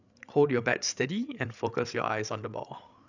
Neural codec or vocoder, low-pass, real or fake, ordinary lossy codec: codec, 16 kHz, 8 kbps, FreqCodec, larger model; 7.2 kHz; fake; none